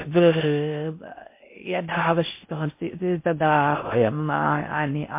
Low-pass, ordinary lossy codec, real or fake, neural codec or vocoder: 3.6 kHz; MP3, 24 kbps; fake; codec, 16 kHz in and 24 kHz out, 0.6 kbps, FocalCodec, streaming, 4096 codes